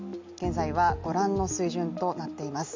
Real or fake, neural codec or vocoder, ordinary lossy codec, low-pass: real; none; MP3, 64 kbps; 7.2 kHz